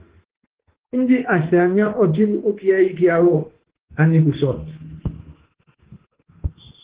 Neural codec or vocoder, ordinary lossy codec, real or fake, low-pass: autoencoder, 48 kHz, 32 numbers a frame, DAC-VAE, trained on Japanese speech; Opus, 16 kbps; fake; 3.6 kHz